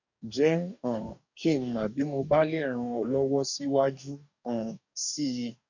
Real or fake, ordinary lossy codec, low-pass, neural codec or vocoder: fake; none; 7.2 kHz; codec, 44.1 kHz, 2.6 kbps, DAC